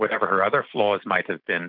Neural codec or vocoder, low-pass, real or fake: none; 5.4 kHz; real